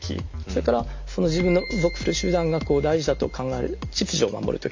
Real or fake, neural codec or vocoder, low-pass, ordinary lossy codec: real; none; 7.2 kHz; MP3, 48 kbps